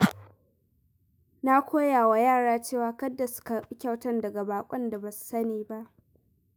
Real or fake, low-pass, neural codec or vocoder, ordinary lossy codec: fake; none; autoencoder, 48 kHz, 128 numbers a frame, DAC-VAE, trained on Japanese speech; none